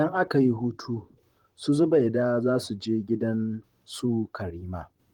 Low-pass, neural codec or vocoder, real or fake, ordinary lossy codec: 19.8 kHz; vocoder, 44.1 kHz, 128 mel bands, Pupu-Vocoder; fake; Opus, 24 kbps